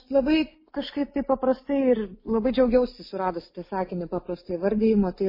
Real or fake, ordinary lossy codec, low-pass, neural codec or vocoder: real; MP3, 24 kbps; 5.4 kHz; none